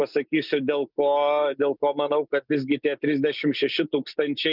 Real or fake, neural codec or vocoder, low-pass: real; none; 5.4 kHz